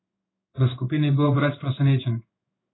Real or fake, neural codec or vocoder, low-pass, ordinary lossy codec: fake; codec, 16 kHz in and 24 kHz out, 1 kbps, XY-Tokenizer; 7.2 kHz; AAC, 16 kbps